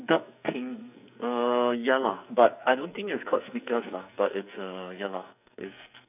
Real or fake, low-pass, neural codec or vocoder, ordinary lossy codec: fake; 3.6 kHz; codec, 44.1 kHz, 2.6 kbps, SNAC; none